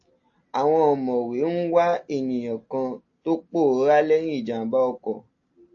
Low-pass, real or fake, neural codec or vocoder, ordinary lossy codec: 7.2 kHz; real; none; AAC, 48 kbps